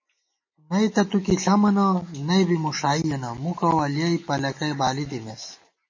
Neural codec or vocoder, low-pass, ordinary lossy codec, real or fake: none; 7.2 kHz; MP3, 32 kbps; real